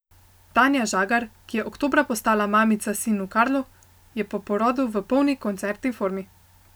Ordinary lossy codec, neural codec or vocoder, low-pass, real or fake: none; none; none; real